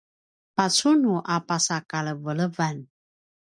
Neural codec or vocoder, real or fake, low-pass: none; real; 9.9 kHz